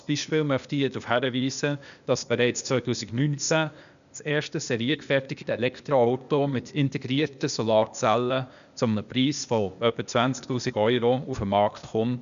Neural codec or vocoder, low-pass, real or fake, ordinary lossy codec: codec, 16 kHz, 0.8 kbps, ZipCodec; 7.2 kHz; fake; none